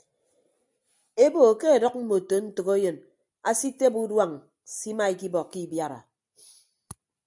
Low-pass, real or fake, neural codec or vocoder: 10.8 kHz; real; none